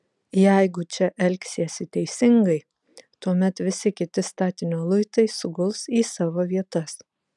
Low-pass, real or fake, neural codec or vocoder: 10.8 kHz; real; none